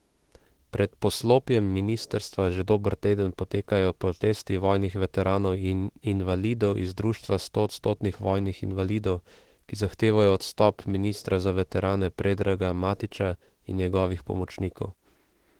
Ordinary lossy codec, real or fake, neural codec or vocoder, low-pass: Opus, 16 kbps; fake; autoencoder, 48 kHz, 32 numbers a frame, DAC-VAE, trained on Japanese speech; 19.8 kHz